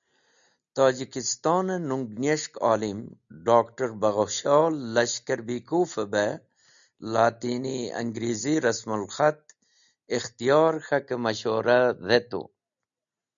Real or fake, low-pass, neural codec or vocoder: real; 7.2 kHz; none